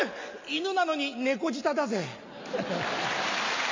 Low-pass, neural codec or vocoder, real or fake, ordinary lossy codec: 7.2 kHz; none; real; MP3, 48 kbps